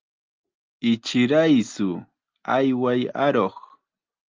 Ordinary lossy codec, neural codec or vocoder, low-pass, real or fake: Opus, 32 kbps; none; 7.2 kHz; real